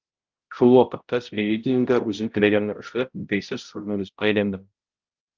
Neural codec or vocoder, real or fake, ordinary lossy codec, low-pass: codec, 16 kHz, 0.5 kbps, X-Codec, HuBERT features, trained on balanced general audio; fake; Opus, 32 kbps; 7.2 kHz